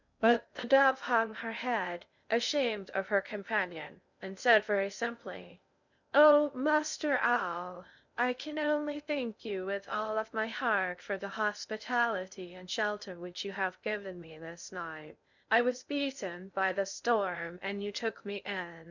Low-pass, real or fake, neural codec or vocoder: 7.2 kHz; fake; codec, 16 kHz in and 24 kHz out, 0.6 kbps, FocalCodec, streaming, 2048 codes